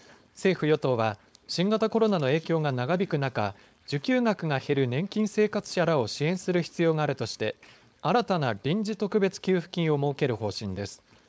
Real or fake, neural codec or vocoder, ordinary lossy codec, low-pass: fake; codec, 16 kHz, 4.8 kbps, FACodec; none; none